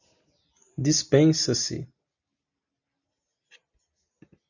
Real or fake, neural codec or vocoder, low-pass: real; none; 7.2 kHz